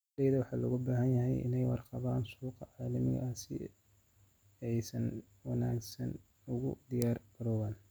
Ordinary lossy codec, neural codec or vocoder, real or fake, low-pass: none; none; real; none